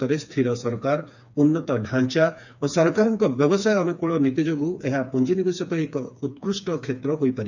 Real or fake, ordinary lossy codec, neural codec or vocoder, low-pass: fake; none; codec, 16 kHz, 4 kbps, FreqCodec, smaller model; 7.2 kHz